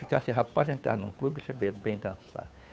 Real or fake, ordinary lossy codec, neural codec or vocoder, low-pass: fake; none; codec, 16 kHz, 2 kbps, FunCodec, trained on Chinese and English, 25 frames a second; none